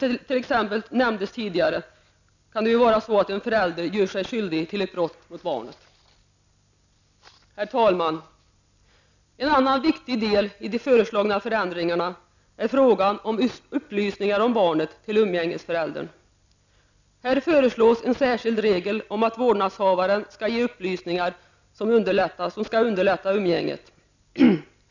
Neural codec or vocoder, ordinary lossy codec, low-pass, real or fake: none; none; 7.2 kHz; real